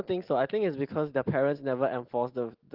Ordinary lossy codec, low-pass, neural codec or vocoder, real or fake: Opus, 16 kbps; 5.4 kHz; none; real